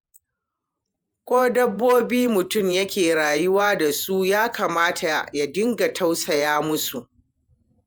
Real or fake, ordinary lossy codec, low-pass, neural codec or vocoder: fake; none; none; vocoder, 48 kHz, 128 mel bands, Vocos